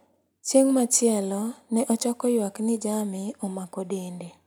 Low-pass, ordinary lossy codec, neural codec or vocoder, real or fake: none; none; none; real